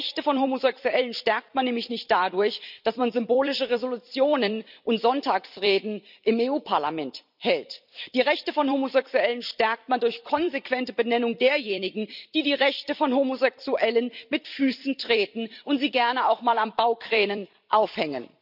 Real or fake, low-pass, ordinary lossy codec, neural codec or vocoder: fake; 5.4 kHz; none; vocoder, 44.1 kHz, 128 mel bands every 256 samples, BigVGAN v2